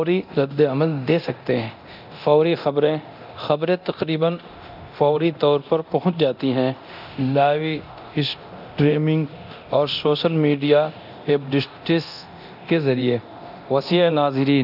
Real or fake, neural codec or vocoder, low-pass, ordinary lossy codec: fake; codec, 24 kHz, 0.9 kbps, DualCodec; 5.4 kHz; none